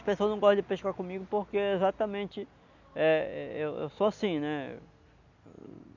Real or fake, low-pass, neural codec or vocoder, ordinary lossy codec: real; 7.2 kHz; none; none